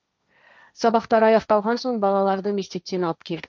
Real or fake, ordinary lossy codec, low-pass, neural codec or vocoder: fake; none; none; codec, 16 kHz, 1.1 kbps, Voila-Tokenizer